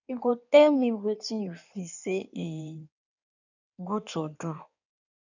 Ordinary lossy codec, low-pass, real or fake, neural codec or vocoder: none; 7.2 kHz; fake; codec, 16 kHz in and 24 kHz out, 1.1 kbps, FireRedTTS-2 codec